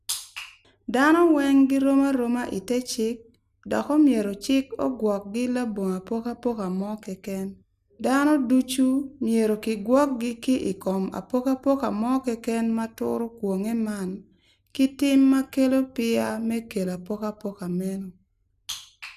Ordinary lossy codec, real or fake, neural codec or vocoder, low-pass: none; real; none; 14.4 kHz